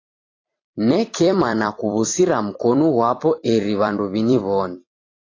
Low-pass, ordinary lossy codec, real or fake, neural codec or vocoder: 7.2 kHz; AAC, 32 kbps; fake; vocoder, 44.1 kHz, 128 mel bands every 256 samples, BigVGAN v2